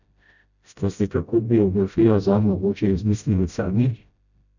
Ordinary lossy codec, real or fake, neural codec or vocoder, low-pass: none; fake; codec, 16 kHz, 0.5 kbps, FreqCodec, smaller model; 7.2 kHz